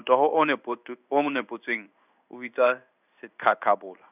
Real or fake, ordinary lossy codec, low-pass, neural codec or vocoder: fake; none; 3.6 kHz; codec, 16 kHz in and 24 kHz out, 1 kbps, XY-Tokenizer